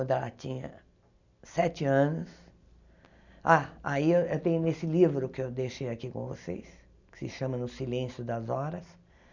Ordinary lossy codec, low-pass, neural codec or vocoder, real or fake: none; 7.2 kHz; none; real